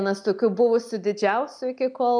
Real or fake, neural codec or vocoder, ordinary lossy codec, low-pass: real; none; MP3, 96 kbps; 9.9 kHz